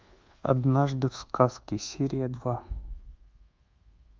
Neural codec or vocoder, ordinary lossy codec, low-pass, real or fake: codec, 24 kHz, 1.2 kbps, DualCodec; Opus, 24 kbps; 7.2 kHz; fake